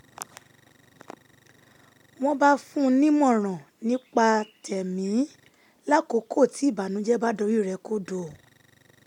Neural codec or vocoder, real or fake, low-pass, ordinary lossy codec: none; real; 19.8 kHz; none